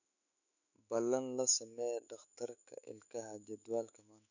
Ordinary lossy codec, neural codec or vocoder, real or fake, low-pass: none; autoencoder, 48 kHz, 128 numbers a frame, DAC-VAE, trained on Japanese speech; fake; 7.2 kHz